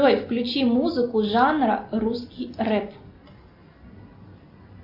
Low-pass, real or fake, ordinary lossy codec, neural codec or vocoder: 5.4 kHz; real; MP3, 32 kbps; none